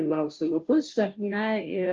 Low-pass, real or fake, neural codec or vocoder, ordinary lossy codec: 7.2 kHz; fake; codec, 16 kHz, 0.5 kbps, FunCodec, trained on Chinese and English, 25 frames a second; Opus, 32 kbps